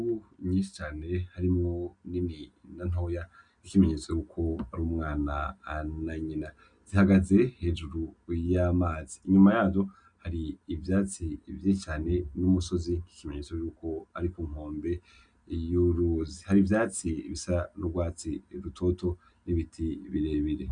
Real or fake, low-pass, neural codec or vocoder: real; 9.9 kHz; none